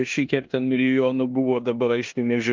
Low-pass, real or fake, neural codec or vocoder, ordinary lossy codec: 7.2 kHz; fake; codec, 16 kHz in and 24 kHz out, 0.9 kbps, LongCat-Audio-Codec, four codebook decoder; Opus, 32 kbps